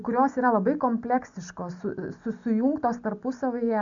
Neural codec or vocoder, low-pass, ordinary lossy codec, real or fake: none; 7.2 kHz; MP3, 96 kbps; real